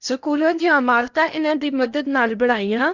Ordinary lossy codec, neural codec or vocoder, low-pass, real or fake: Opus, 64 kbps; codec, 16 kHz in and 24 kHz out, 0.8 kbps, FocalCodec, streaming, 65536 codes; 7.2 kHz; fake